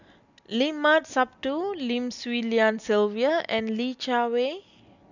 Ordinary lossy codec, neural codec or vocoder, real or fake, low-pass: none; none; real; 7.2 kHz